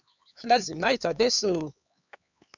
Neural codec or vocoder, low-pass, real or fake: codec, 16 kHz, 4 kbps, X-Codec, HuBERT features, trained on LibriSpeech; 7.2 kHz; fake